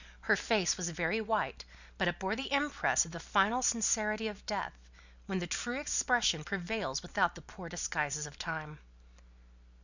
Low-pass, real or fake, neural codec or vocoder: 7.2 kHz; real; none